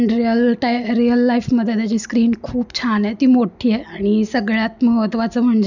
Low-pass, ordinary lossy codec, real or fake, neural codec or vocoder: 7.2 kHz; none; real; none